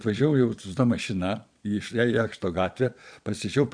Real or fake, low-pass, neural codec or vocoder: fake; 9.9 kHz; vocoder, 22.05 kHz, 80 mel bands, Vocos